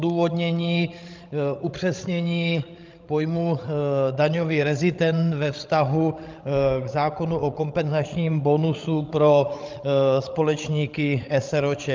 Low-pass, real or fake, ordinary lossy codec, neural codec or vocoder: 7.2 kHz; fake; Opus, 24 kbps; codec, 16 kHz, 16 kbps, FreqCodec, larger model